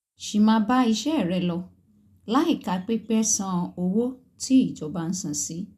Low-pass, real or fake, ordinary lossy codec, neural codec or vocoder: 14.4 kHz; real; none; none